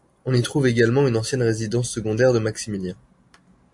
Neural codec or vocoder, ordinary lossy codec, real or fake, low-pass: none; MP3, 64 kbps; real; 10.8 kHz